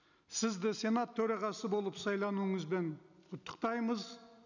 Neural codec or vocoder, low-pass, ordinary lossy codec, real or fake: none; 7.2 kHz; none; real